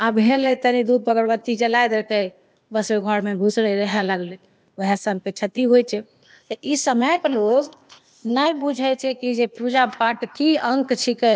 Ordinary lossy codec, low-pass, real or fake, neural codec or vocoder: none; none; fake; codec, 16 kHz, 0.8 kbps, ZipCodec